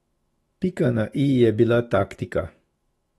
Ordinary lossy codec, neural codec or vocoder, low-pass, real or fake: AAC, 32 kbps; autoencoder, 48 kHz, 128 numbers a frame, DAC-VAE, trained on Japanese speech; 19.8 kHz; fake